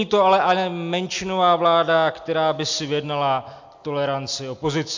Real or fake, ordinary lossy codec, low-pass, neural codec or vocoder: real; MP3, 48 kbps; 7.2 kHz; none